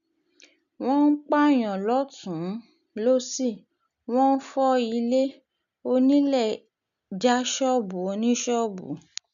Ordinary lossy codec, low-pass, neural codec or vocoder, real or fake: none; 7.2 kHz; none; real